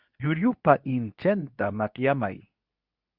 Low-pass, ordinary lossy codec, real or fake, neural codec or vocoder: 5.4 kHz; Opus, 64 kbps; fake; codec, 24 kHz, 0.9 kbps, WavTokenizer, medium speech release version 2